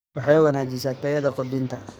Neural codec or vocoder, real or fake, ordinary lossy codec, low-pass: codec, 44.1 kHz, 2.6 kbps, SNAC; fake; none; none